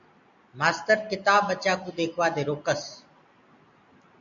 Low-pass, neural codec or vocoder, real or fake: 7.2 kHz; none; real